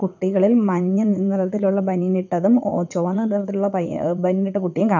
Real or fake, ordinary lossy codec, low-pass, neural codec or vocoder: fake; none; 7.2 kHz; vocoder, 22.05 kHz, 80 mel bands, WaveNeXt